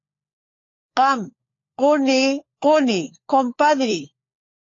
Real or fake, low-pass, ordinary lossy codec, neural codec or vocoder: fake; 7.2 kHz; AAC, 32 kbps; codec, 16 kHz, 4 kbps, FunCodec, trained on LibriTTS, 50 frames a second